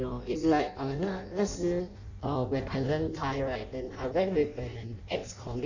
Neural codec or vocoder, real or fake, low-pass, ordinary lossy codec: codec, 16 kHz in and 24 kHz out, 0.6 kbps, FireRedTTS-2 codec; fake; 7.2 kHz; none